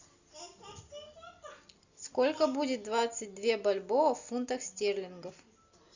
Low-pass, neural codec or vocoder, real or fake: 7.2 kHz; none; real